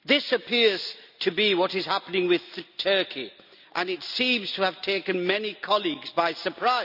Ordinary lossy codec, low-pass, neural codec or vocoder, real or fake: none; 5.4 kHz; none; real